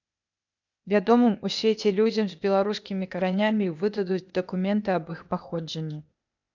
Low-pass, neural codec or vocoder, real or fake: 7.2 kHz; codec, 16 kHz, 0.8 kbps, ZipCodec; fake